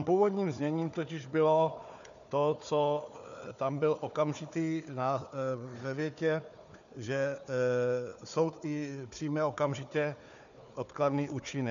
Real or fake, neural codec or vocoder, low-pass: fake; codec, 16 kHz, 4 kbps, FunCodec, trained on Chinese and English, 50 frames a second; 7.2 kHz